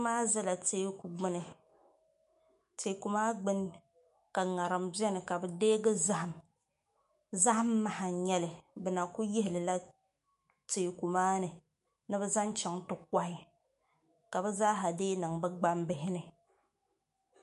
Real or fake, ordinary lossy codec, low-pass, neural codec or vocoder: fake; MP3, 48 kbps; 14.4 kHz; autoencoder, 48 kHz, 128 numbers a frame, DAC-VAE, trained on Japanese speech